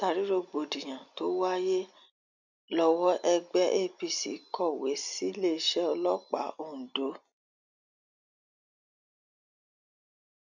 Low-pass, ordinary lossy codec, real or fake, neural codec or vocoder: 7.2 kHz; none; real; none